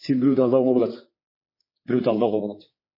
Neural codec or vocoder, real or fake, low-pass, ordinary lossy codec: codec, 16 kHz, 4 kbps, X-Codec, HuBERT features, trained on general audio; fake; 5.4 kHz; MP3, 24 kbps